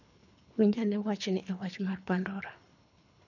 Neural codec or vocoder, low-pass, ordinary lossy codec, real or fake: codec, 24 kHz, 3 kbps, HILCodec; 7.2 kHz; none; fake